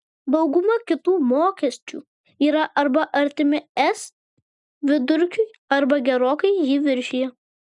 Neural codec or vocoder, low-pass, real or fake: none; 10.8 kHz; real